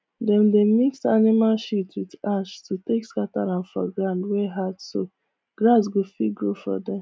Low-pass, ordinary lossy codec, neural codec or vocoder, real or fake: none; none; none; real